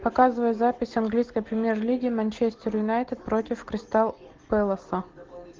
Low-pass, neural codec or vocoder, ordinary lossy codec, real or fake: 7.2 kHz; none; Opus, 16 kbps; real